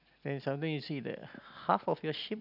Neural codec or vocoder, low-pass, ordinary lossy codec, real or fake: none; 5.4 kHz; none; real